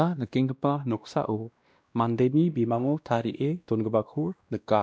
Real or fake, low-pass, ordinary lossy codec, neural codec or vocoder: fake; none; none; codec, 16 kHz, 1 kbps, X-Codec, WavLM features, trained on Multilingual LibriSpeech